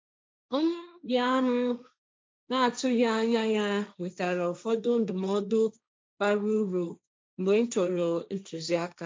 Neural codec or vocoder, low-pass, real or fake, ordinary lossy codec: codec, 16 kHz, 1.1 kbps, Voila-Tokenizer; none; fake; none